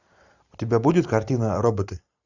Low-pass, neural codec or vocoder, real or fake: 7.2 kHz; none; real